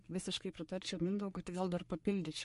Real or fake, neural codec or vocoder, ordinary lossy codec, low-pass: fake; codec, 44.1 kHz, 3.4 kbps, Pupu-Codec; MP3, 48 kbps; 14.4 kHz